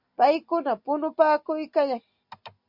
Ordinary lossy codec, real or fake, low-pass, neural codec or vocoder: MP3, 48 kbps; real; 5.4 kHz; none